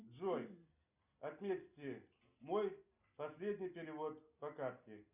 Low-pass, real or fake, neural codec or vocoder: 3.6 kHz; real; none